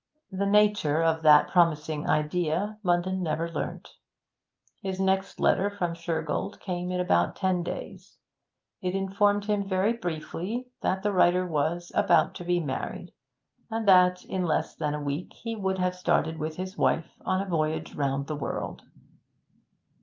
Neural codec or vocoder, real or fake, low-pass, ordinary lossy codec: none; real; 7.2 kHz; Opus, 32 kbps